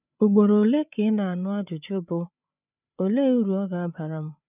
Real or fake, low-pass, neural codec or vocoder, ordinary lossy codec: fake; 3.6 kHz; codec, 16 kHz, 8 kbps, FreqCodec, larger model; none